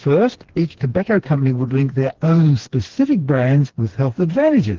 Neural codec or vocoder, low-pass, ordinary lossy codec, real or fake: codec, 16 kHz, 2 kbps, FreqCodec, smaller model; 7.2 kHz; Opus, 16 kbps; fake